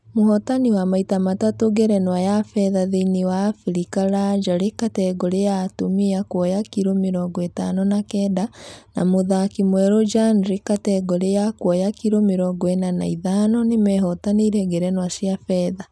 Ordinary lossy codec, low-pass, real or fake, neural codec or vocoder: none; none; real; none